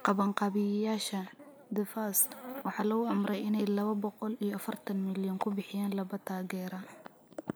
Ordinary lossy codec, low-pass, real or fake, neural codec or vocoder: none; none; real; none